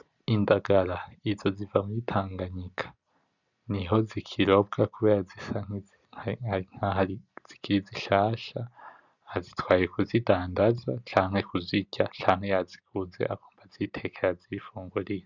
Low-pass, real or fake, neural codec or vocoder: 7.2 kHz; real; none